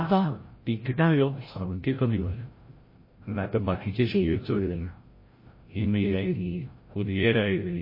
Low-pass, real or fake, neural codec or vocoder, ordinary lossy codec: 5.4 kHz; fake; codec, 16 kHz, 0.5 kbps, FreqCodec, larger model; MP3, 24 kbps